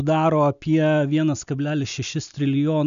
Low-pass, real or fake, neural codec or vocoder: 7.2 kHz; real; none